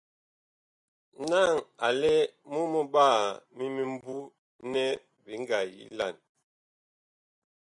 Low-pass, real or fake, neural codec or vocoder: 10.8 kHz; real; none